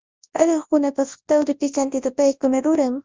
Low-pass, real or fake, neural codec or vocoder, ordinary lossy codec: 7.2 kHz; fake; codec, 24 kHz, 0.9 kbps, WavTokenizer, large speech release; Opus, 32 kbps